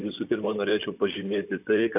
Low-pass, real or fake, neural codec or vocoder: 3.6 kHz; fake; vocoder, 44.1 kHz, 128 mel bands, Pupu-Vocoder